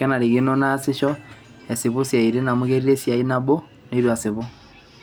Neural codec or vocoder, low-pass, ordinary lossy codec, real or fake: none; none; none; real